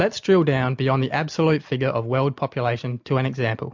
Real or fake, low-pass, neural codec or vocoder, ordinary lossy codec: real; 7.2 kHz; none; MP3, 64 kbps